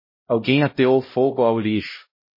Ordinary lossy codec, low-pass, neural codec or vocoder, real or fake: MP3, 24 kbps; 5.4 kHz; codec, 16 kHz, 0.5 kbps, X-Codec, HuBERT features, trained on LibriSpeech; fake